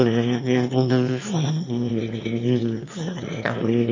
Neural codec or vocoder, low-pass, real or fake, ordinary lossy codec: autoencoder, 22.05 kHz, a latent of 192 numbers a frame, VITS, trained on one speaker; 7.2 kHz; fake; MP3, 32 kbps